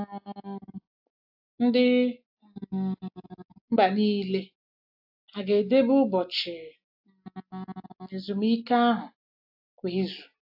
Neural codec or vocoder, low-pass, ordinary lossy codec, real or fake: none; 5.4 kHz; AAC, 48 kbps; real